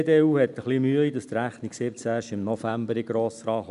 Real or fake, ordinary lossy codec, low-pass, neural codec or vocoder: real; none; 10.8 kHz; none